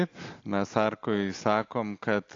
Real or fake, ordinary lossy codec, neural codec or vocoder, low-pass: real; AAC, 48 kbps; none; 7.2 kHz